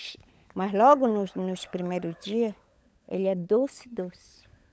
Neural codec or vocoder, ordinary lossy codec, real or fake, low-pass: codec, 16 kHz, 16 kbps, FunCodec, trained on LibriTTS, 50 frames a second; none; fake; none